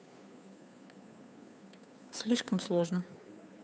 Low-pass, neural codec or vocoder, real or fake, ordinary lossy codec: none; codec, 16 kHz, 2 kbps, FunCodec, trained on Chinese and English, 25 frames a second; fake; none